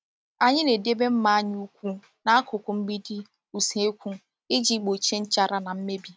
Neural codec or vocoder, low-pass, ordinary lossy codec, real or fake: none; none; none; real